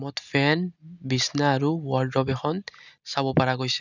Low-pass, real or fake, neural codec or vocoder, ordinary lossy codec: 7.2 kHz; real; none; none